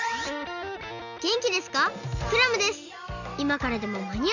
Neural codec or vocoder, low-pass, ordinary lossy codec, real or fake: none; 7.2 kHz; none; real